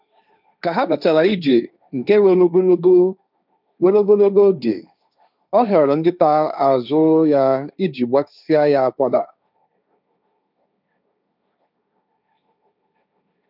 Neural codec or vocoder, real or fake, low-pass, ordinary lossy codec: codec, 16 kHz, 1.1 kbps, Voila-Tokenizer; fake; 5.4 kHz; none